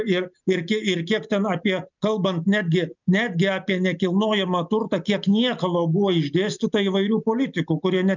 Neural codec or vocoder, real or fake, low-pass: none; real; 7.2 kHz